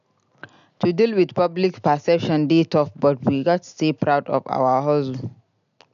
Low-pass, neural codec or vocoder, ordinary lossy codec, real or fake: 7.2 kHz; none; none; real